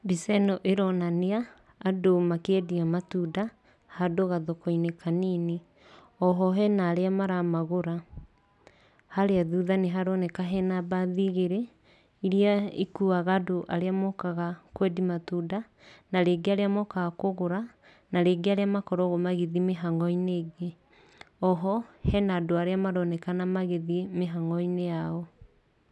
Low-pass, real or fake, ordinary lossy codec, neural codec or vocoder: none; real; none; none